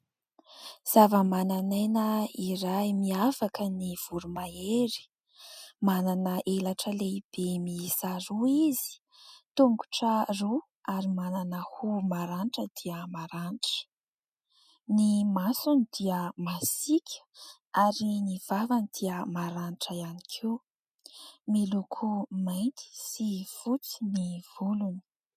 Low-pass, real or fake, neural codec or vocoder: 14.4 kHz; real; none